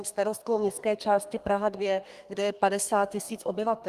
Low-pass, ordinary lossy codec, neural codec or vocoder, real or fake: 14.4 kHz; Opus, 32 kbps; codec, 32 kHz, 1.9 kbps, SNAC; fake